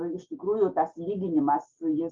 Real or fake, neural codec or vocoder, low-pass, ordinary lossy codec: real; none; 7.2 kHz; Opus, 32 kbps